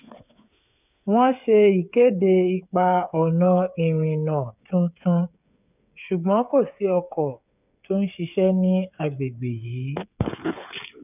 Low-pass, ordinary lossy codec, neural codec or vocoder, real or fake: 3.6 kHz; none; codec, 16 kHz, 8 kbps, FreqCodec, smaller model; fake